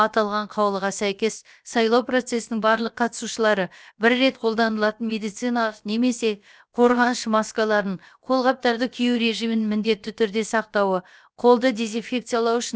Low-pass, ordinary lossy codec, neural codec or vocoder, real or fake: none; none; codec, 16 kHz, about 1 kbps, DyCAST, with the encoder's durations; fake